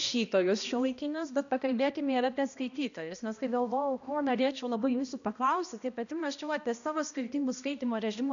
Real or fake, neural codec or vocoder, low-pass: fake; codec, 16 kHz, 1 kbps, X-Codec, HuBERT features, trained on balanced general audio; 7.2 kHz